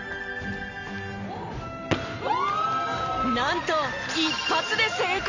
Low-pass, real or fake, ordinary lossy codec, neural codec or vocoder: 7.2 kHz; real; none; none